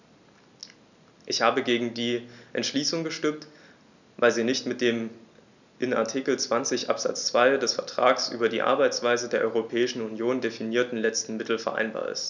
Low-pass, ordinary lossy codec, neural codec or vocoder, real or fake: 7.2 kHz; none; none; real